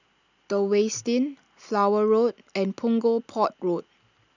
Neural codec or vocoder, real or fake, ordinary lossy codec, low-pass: none; real; none; 7.2 kHz